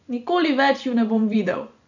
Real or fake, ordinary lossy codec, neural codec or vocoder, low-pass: fake; none; vocoder, 24 kHz, 100 mel bands, Vocos; 7.2 kHz